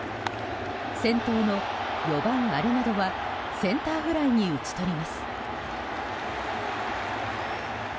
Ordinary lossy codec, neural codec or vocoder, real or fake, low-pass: none; none; real; none